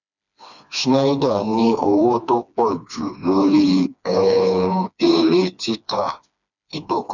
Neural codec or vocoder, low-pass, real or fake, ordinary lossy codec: codec, 16 kHz, 2 kbps, FreqCodec, smaller model; 7.2 kHz; fake; none